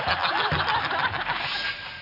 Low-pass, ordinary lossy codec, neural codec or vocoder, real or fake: 5.4 kHz; none; none; real